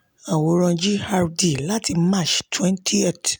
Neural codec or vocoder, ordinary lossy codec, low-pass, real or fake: none; none; none; real